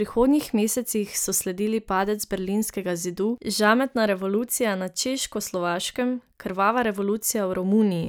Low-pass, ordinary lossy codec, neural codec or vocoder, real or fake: none; none; none; real